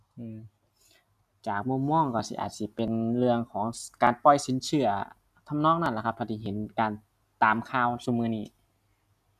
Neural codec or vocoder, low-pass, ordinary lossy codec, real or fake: none; 14.4 kHz; none; real